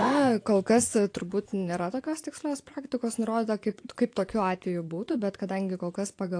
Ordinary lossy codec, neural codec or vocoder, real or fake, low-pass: AAC, 48 kbps; vocoder, 24 kHz, 100 mel bands, Vocos; fake; 9.9 kHz